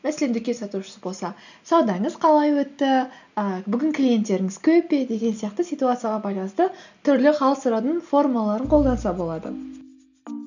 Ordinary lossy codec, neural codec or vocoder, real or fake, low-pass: none; none; real; 7.2 kHz